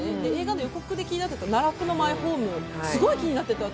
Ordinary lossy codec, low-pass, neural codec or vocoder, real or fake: none; none; none; real